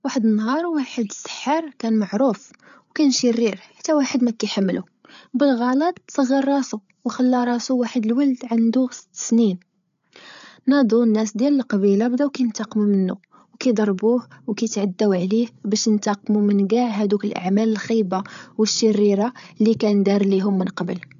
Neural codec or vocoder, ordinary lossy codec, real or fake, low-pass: codec, 16 kHz, 8 kbps, FreqCodec, larger model; none; fake; 7.2 kHz